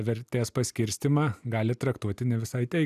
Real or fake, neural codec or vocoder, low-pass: real; none; 14.4 kHz